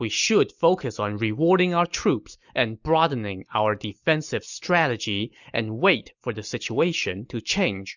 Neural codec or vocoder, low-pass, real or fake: none; 7.2 kHz; real